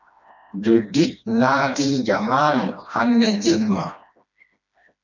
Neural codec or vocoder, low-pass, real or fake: codec, 16 kHz, 1 kbps, FreqCodec, smaller model; 7.2 kHz; fake